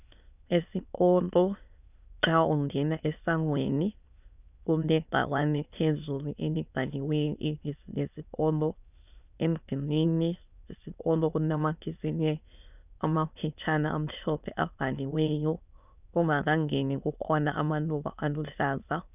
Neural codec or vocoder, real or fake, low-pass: autoencoder, 22.05 kHz, a latent of 192 numbers a frame, VITS, trained on many speakers; fake; 3.6 kHz